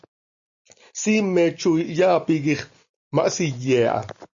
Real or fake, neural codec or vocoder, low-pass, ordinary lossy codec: real; none; 7.2 kHz; AAC, 64 kbps